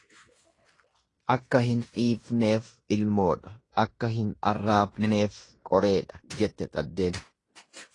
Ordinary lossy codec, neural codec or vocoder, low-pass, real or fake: AAC, 32 kbps; codec, 16 kHz in and 24 kHz out, 0.9 kbps, LongCat-Audio-Codec, fine tuned four codebook decoder; 10.8 kHz; fake